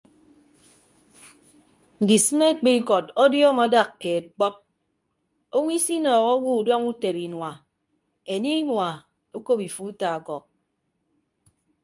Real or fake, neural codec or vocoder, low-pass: fake; codec, 24 kHz, 0.9 kbps, WavTokenizer, medium speech release version 2; 10.8 kHz